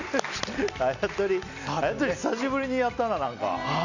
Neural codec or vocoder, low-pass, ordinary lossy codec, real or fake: none; 7.2 kHz; none; real